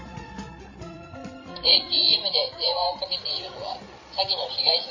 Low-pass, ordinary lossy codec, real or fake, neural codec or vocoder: 7.2 kHz; MP3, 32 kbps; fake; codec, 16 kHz, 16 kbps, FreqCodec, larger model